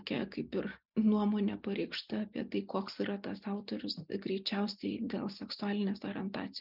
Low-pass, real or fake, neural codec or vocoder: 5.4 kHz; real; none